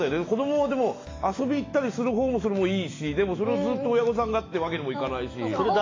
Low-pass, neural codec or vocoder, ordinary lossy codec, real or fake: 7.2 kHz; autoencoder, 48 kHz, 128 numbers a frame, DAC-VAE, trained on Japanese speech; AAC, 32 kbps; fake